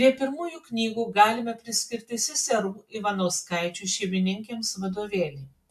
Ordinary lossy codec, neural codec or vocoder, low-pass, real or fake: AAC, 96 kbps; none; 14.4 kHz; real